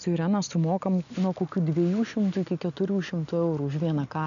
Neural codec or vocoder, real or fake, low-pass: none; real; 7.2 kHz